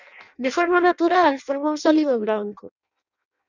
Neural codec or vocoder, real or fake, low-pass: codec, 16 kHz in and 24 kHz out, 0.6 kbps, FireRedTTS-2 codec; fake; 7.2 kHz